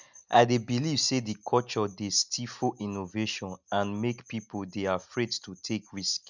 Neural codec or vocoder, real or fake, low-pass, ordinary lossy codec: none; real; 7.2 kHz; none